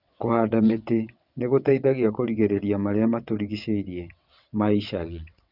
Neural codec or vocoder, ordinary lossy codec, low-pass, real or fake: vocoder, 22.05 kHz, 80 mel bands, WaveNeXt; none; 5.4 kHz; fake